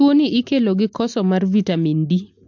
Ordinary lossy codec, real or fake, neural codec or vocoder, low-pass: MP3, 48 kbps; real; none; 7.2 kHz